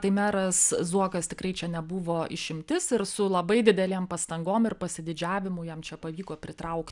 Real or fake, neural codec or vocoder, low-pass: real; none; 10.8 kHz